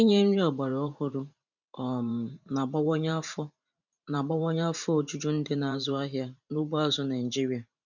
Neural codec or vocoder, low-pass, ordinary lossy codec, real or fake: vocoder, 44.1 kHz, 128 mel bands every 512 samples, BigVGAN v2; 7.2 kHz; none; fake